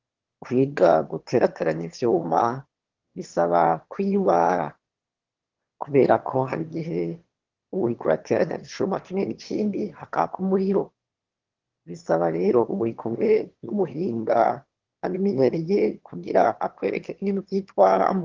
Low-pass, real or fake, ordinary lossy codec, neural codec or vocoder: 7.2 kHz; fake; Opus, 16 kbps; autoencoder, 22.05 kHz, a latent of 192 numbers a frame, VITS, trained on one speaker